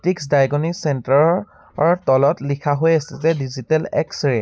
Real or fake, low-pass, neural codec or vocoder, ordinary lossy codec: real; none; none; none